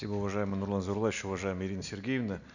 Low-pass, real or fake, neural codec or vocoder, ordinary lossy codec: 7.2 kHz; real; none; none